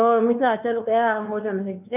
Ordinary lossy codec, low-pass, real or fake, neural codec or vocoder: none; 3.6 kHz; fake; codec, 16 kHz, 2 kbps, X-Codec, WavLM features, trained on Multilingual LibriSpeech